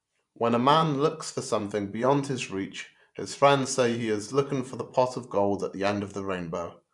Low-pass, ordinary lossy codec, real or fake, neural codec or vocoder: 10.8 kHz; none; fake; vocoder, 24 kHz, 100 mel bands, Vocos